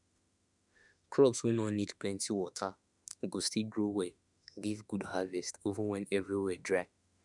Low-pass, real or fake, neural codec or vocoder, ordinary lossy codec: 10.8 kHz; fake; autoencoder, 48 kHz, 32 numbers a frame, DAC-VAE, trained on Japanese speech; none